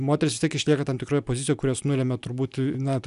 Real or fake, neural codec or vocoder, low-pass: real; none; 10.8 kHz